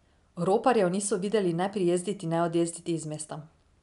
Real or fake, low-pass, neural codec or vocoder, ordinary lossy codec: real; 10.8 kHz; none; none